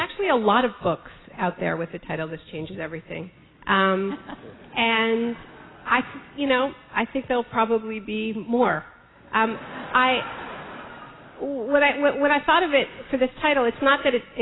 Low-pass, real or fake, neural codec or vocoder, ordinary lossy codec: 7.2 kHz; real; none; AAC, 16 kbps